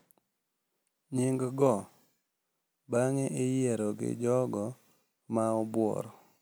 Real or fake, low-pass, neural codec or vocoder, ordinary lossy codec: real; none; none; none